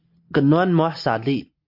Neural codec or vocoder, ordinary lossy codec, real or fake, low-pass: none; MP3, 48 kbps; real; 5.4 kHz